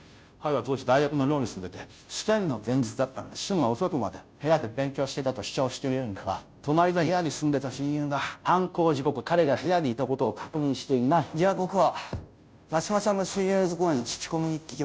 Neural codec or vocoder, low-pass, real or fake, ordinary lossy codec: codec, 16 kHz, 0.5 kbps, FunCodec, trained on Chinese and English, 25 frames a second; none; fake; none